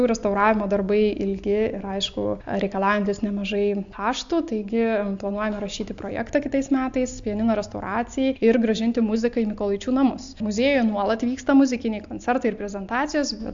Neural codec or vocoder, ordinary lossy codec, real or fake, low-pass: none; AAC, 64 kbps; real; 7.2 kHz